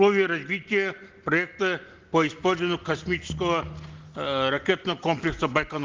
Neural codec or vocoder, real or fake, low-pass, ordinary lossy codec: none; real; 7.2 kHz; Opus, 16 kbps